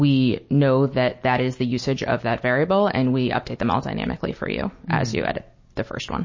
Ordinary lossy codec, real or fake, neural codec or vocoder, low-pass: MP3, 32 kbps; real; none; 7.2 kHz